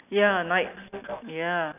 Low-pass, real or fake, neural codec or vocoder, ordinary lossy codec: 3.6 kHz; real; none; none